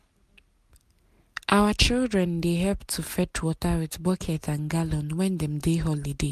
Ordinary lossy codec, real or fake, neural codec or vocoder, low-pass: MP3, 96 kbps; real; none; 14.4 kHz